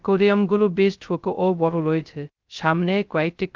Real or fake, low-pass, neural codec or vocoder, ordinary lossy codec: fake; 7.2 kHz; codec, 16 kHz, 0.2 kbps, FocalCodec; Opus, 32 kbps